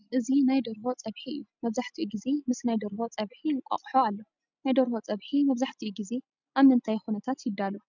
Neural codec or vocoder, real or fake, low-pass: none; real; 7.2 kHz